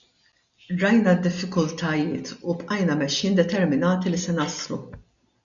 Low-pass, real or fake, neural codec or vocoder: 7.2 kHz; real; none